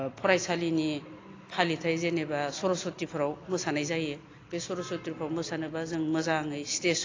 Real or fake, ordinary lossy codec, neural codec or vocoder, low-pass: real; AAC, 32 kbps; none; 7.2 kHz